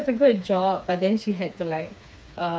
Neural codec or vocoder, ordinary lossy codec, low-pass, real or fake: codec, 16 kHz, 4 kbps, FreqCodec, smaller model; none; none; fake